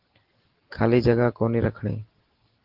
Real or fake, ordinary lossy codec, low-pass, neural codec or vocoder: real; Opus, 16 kbps; 5.4 kHz; none